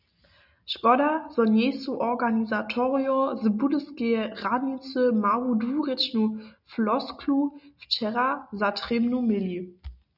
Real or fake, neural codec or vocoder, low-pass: real; none; 5.4 kHz